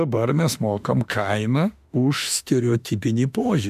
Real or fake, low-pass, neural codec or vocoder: fake; 14.4 kHz; autoencoder, 48 kHz, 32 numbers a frame, DAC-VAE, trained on Japanese speech